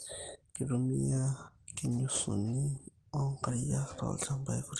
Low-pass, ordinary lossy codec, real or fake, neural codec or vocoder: 9.9 kHz; Opus, 16 kbps; real; none